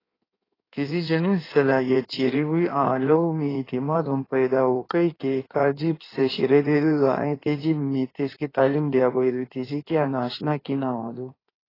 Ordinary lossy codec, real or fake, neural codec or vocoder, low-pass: AAC, 24 kbps; fake; codec, 16 kHz in and 24 kHz out, 1.1 kbps, FireRedTTS-2 codec; 5.4 kHz